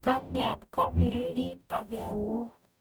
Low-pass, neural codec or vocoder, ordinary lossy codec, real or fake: none; codec, 44.1 kHz, 0.9 kbps, DAC; none; fake